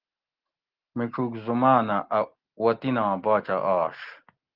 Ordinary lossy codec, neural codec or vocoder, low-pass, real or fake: Opus, 16 kbps; none; 5.4 kHz; real